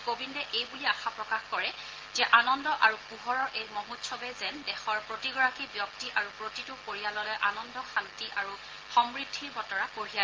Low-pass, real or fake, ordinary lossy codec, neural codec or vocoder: 7.2 kHz; real; Opus, 16 kbps; none